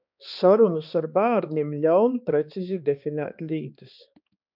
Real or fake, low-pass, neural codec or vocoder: fake; 5.4 kHz; codec, 16 kHz, 2 kbps, X-Codec, HuBERT features, trained on balanced general audio